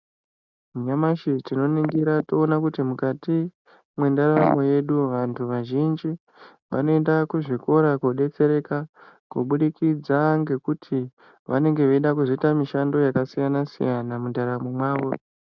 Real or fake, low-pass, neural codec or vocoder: real; 7.2 kHz; none